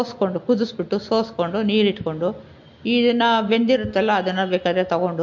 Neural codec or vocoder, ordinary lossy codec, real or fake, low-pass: none; MP3, 48 kbps; real; 7.2 kHz